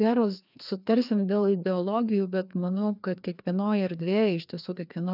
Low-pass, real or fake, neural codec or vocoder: 5.4 kHz; fake; codec, 16 kHz, 2 kbps, FreqCodec, larger model